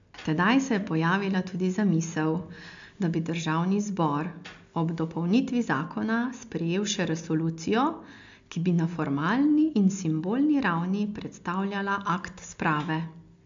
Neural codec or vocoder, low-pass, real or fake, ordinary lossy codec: none; 7.2 kHz; real; MP3, 64 kbps